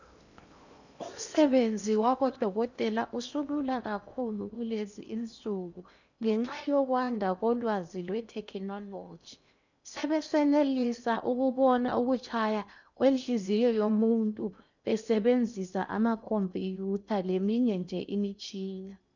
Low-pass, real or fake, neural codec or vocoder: 7.2 kHz; fake; codec, 16 kHz in and 24 kHz out, 0.8 kbps, FocalCodec, streaming, 65536 codes